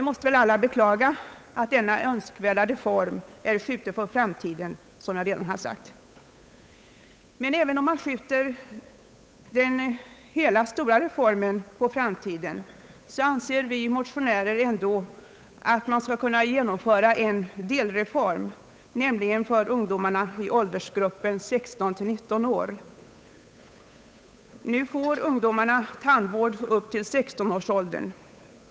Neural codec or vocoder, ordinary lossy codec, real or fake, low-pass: codec, 16 kHz, 8 kbps, FunCodec, trained on Chinese and English, 25 frames a second; none; fake; none